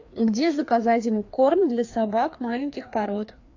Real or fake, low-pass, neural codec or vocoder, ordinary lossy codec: fake; 7.2 kHz; codec, 16 kHz, 2 kbps, FreqCodec, larger model; AAC, 48 kbps